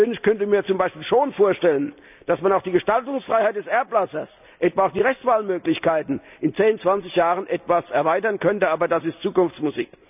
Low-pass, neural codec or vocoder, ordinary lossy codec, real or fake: 3.6 kHz; none; none; real